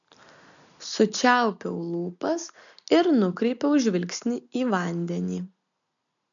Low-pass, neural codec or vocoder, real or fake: 7.2 kHz; none; real